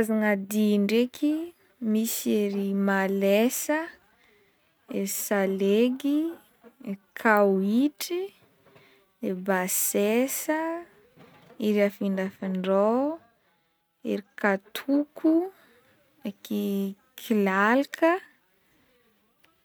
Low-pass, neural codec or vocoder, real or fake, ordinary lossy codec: none; none; real; none